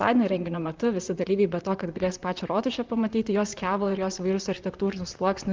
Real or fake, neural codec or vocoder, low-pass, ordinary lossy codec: fake; vocoder, 44.1 kHz, 128 mel bands, Pupu-Vocoder; 7.2 kHz; Opus, 24 kbps